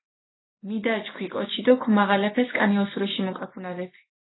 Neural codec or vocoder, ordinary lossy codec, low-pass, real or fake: none; AAC, 16 kbps; 7.2 kHz; real